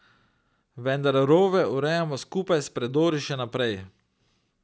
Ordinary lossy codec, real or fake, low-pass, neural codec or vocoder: none; real; none; none